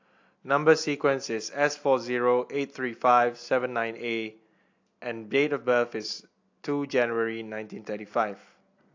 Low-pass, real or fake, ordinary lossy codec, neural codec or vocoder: 7.2 kHz; real; AAC, 48 kbps; none